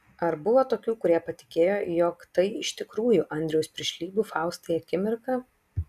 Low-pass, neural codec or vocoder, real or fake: 14.4 kHz; none; real